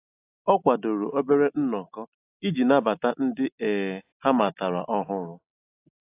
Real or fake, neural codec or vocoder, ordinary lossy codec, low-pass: real; none; AAC, 24 kbps; 3.6 kHz